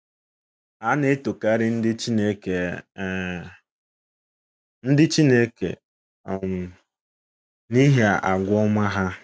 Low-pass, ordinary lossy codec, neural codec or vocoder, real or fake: none; none; none; real